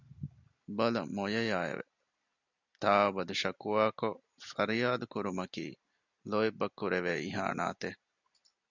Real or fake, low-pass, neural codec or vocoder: real; 7.2 kHz; none